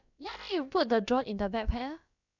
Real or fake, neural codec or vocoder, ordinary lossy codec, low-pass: fake; codec, 16 kHz, about 1 kbps, DyCAST, with the encoder's durations; none; 7.2 kHz